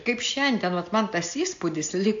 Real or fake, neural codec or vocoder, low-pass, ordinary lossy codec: real; none; 7.2 kHz; AAC, 64 kbps